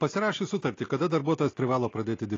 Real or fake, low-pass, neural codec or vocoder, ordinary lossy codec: real; 7.2 kHz; none; AAC, 32 kbps